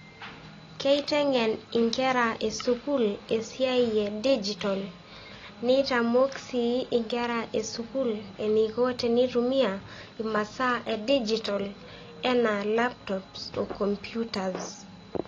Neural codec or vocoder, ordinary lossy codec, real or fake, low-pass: none; AAC, 32 kbps; real; 7.2 kHz